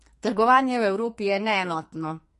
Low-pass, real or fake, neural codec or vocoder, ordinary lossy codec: 14.4 kHz; fake; codec, 44.1 kHz, 2.6 kbps, SNAC; MP3, 48 kbps